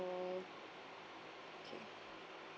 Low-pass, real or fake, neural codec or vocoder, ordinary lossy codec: none; real; none; none